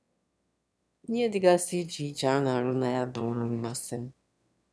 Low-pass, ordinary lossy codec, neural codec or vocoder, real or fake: none; none; autoencoder, 22.05 kHz, a latent of 192 numbers a frame, VITS, trained on one speaker; fake